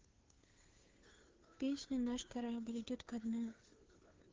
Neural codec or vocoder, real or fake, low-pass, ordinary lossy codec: codec, 16 kHz, 2 kbps, FunCodec, trained on Chinese and English, 25 frames a second; fake; 7.2 kHz; Opus, 16 kbps